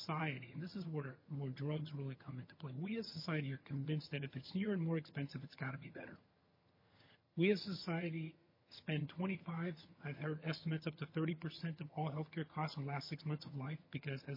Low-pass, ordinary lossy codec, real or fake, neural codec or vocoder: 5.4 kHz; MP3, 24 kbps; fake; vocoder, 22.05 kHz, 80 mel bands, HiFi-GAN